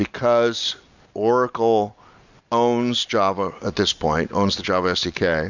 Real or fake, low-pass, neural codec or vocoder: real; 7.2 kHz; none